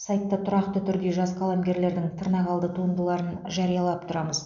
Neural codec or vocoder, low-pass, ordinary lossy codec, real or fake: none; 7.2 kHz; none; real